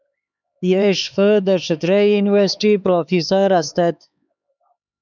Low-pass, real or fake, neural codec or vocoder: 7.2 kHz; fake; codec, 16 kHz, 4 kbps, X-Codec, HuBERT features, trained on LibriSpeech